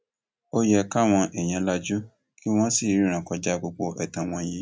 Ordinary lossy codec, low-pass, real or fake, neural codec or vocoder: none; none; real; none